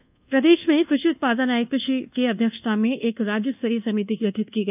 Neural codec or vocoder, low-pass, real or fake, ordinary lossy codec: codec, 24 kHz, 1.2 kbps, DualCodec; 3.6 kHz; fake; none